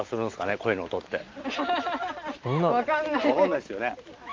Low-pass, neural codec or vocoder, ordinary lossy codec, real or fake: 7.2 kHz; none; Opus, 32 kbps; real